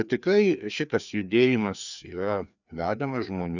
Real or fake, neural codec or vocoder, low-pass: fake; codec, 16 kHz, 2 kbps, FreqCodec, larger model; 7.2 kHz